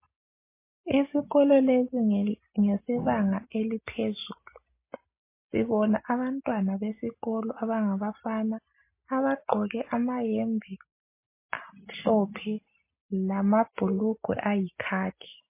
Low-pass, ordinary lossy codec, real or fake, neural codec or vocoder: 3.6 kHz; MP3, 24 kbps; real; none